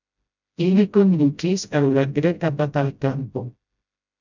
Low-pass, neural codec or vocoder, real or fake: 7.2 kHz; codec, 16 kHz, 0.5 kbps, FreqCodec, smaller model; fake